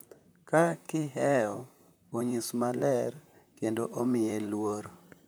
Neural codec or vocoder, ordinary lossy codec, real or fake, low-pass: vocoder, 44.1 kHz, 128 mel bands, Pupu-Vocoder; none; fake; none